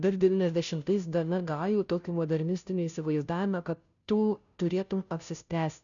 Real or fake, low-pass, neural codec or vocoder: fake; 7.2 kHz; codec, 16 kHz, 0.5 kbps, FunCodec, trained on Chinese and English, 25 frames a second